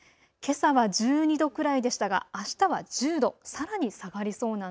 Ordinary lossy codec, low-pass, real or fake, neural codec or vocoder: none; none; real; none